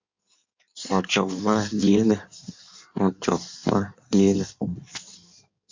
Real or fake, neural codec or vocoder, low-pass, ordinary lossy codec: fake; codec, 16 kHz in and 24 kHz out, 1.1 kbps, FireRedTTS-2 codec; 7.2 kHz; MP3, 64 kbps